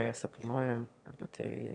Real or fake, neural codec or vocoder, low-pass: fake; autoencoder, 22.05 kHz, a latent of 192 numbers a frame, VITS, trained on one speaker; 9.9 kHz